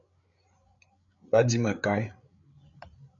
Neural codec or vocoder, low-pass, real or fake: codec, 16 kHz, 8 kbps, FreqCodec, larger model; 7.2 kHz; fake